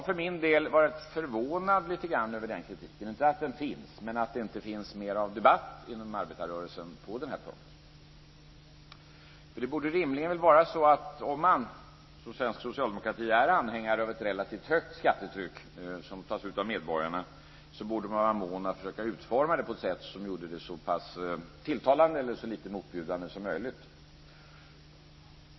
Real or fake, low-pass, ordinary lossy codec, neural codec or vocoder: real; 7.2 kHz; MP3, 24 kbps; none